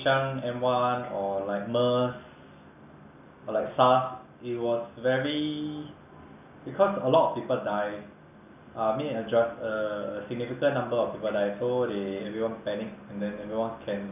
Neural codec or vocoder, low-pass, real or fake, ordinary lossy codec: none; 3.6 kHz; real; none